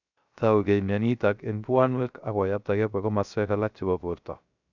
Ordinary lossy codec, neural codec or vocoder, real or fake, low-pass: none; codec, 16 kHz, 0.3 kbps, FocalCodec; fake; 7.2 kHz